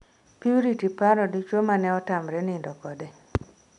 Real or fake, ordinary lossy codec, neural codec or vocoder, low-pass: real; none; none; 10.8 kHz